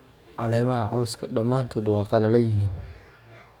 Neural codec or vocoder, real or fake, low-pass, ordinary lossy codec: codec, 44.1 kHz, 2.6 kbps, DAC; fake; 19.8 kHz; none